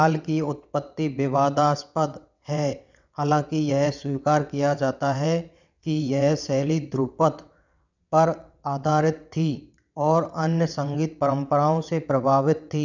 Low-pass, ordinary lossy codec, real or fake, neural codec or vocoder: 7.2 kHz; none; fake; vocoder, 22.05 kHz, 80 mel bands, WaveNeXt